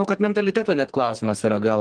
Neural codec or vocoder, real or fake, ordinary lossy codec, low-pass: codec, 44.1 kHz, 2.6 kbps, SNAC; fake; Opus, 24 kbps; 9.9 kHz